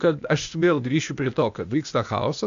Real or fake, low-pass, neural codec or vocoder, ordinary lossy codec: fake; 7.2 kHz; codec, 16 kHz, 0.8 kbps, ZipCodec; MP3, 64 kbps